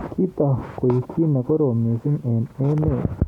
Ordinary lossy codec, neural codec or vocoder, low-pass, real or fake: none; none; 19.8 kHz; real